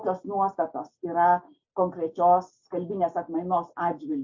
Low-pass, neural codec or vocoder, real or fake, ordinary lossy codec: 7.2 kHz; none; real; MP3, 48 kbps